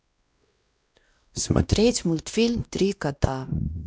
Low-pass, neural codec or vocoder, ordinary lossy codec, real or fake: none; codec, 16 kHz, 1 kbps, X-Codec, WavLM features, trained on Multilingual LibriSpeech; none; fake